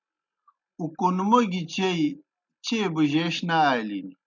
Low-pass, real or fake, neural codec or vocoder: 7.2 kHz; real; none